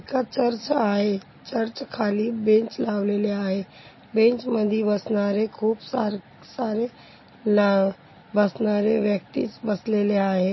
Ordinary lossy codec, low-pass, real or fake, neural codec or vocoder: MP3, 24 kbps; 7.2 kHz; real; none